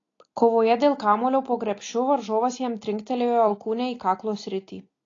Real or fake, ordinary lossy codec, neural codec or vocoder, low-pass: real; AAC, 32 kbps; none; 7.2 kHz